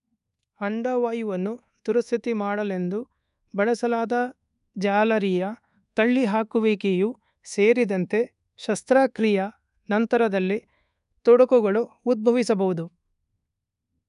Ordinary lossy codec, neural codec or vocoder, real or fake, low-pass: none; codec, 24 kHz, 1.2 kbps, DualCodec; fake; 10.8 kHz